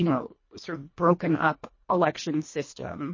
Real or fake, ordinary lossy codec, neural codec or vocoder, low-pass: fake; MP3, 32 kbps; codec, 24 kHz, 1.5 kbps, HILCodec; 7.2 kHz